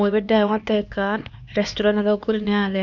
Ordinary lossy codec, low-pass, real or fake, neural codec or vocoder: none; 7.2 kHz; fake; codec, 16 kHz, 2 kbps, X-Codec, HuBERT features, trained on LibriSpeech